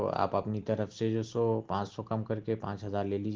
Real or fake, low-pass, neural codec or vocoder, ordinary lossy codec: real; 7.2 kHz; none; Opus, 16 kbps